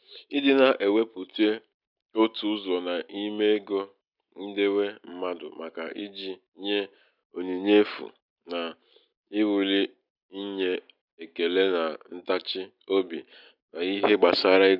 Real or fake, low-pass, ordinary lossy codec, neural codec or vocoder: real; 5.4 kHz; none; none